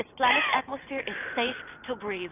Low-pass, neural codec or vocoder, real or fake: 3.6 kHz; none; real